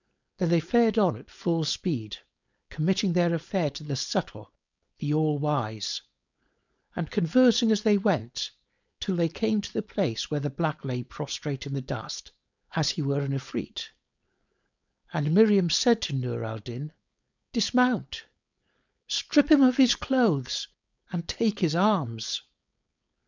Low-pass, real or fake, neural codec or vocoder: 7.2 kHz; fake; codec, 16 kHz, 4.8 kbps, FACodec